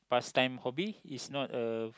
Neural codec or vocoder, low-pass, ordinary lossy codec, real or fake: none; none; none; real